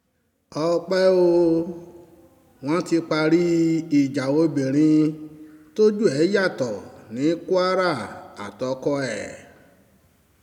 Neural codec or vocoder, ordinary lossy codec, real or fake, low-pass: none; none; real; 19.8 kHz